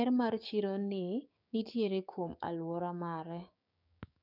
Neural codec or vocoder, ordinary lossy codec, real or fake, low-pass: codec, 16 kHz, 6 kbps, DAC; none; fake; 5.4 kHz